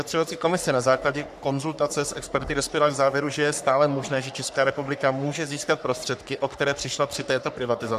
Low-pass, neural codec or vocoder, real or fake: 14.4 kHz; codec, 44.1 kHz, 3.4 kbps, Pupu-Codec; fake